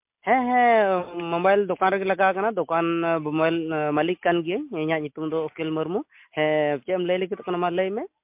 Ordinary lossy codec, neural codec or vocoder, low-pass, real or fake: MP3, 32 kbps; none; 3.6 kHz; real